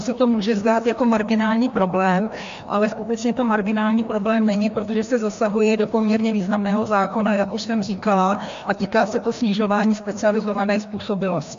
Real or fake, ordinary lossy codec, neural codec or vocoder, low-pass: fake; AAC, 64 kbps; codec, 16 kHz, 1 kbps, FreqCodec, larger model; 7.2 kHz